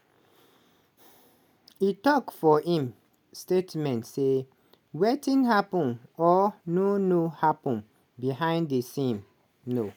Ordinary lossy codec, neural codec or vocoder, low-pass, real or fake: none; none; 19.8 kHz; real